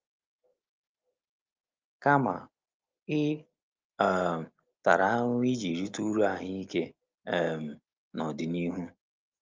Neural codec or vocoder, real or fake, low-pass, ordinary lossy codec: none; real; 7.2 kHz; Opus, 24 kbps